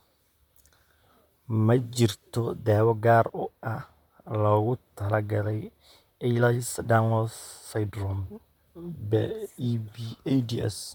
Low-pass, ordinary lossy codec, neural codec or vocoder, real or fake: 19.8 kHz; MP3, 96 kbps; vocoder, 44.1 kHz, 128 mel bands, Pupu-Vocoder; fake